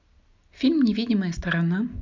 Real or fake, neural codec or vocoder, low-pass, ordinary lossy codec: real; none; 7.2 kHz; MP3, 64 kbps